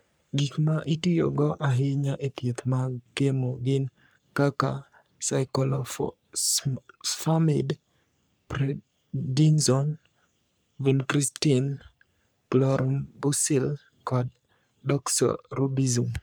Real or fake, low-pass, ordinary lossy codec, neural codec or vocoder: fake; none; none; codec, 44.1 kHz, 3.4 kbps, Pupu-Codec